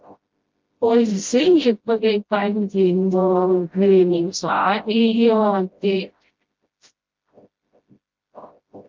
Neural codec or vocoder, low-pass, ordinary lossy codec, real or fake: codec, 16 kHz, 0.5 kbps, FreqCodec, smaller model; 7.2 kHz; Opus, 24 kbps; fake